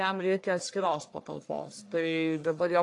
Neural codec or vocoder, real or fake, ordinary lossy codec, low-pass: codec, 44.1 kHz, 1.7 kbps, Pupu-Codec; fake; AAC, 48 kbps; 10.8 kHz